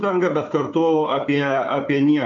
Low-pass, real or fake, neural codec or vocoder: 7.2 kHz; fake; codec, 16 kHz, 4 kbps, FunCodec, trained on Chinese and English, 50 frames a second